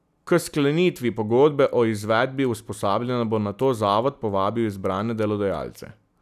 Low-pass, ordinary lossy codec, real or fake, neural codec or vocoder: 14.4 kHz; none; real; none